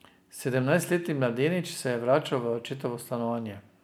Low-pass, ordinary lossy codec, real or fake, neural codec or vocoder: none; none; real; none